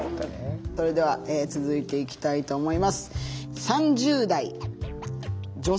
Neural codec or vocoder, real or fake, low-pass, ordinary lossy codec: none; real; none; none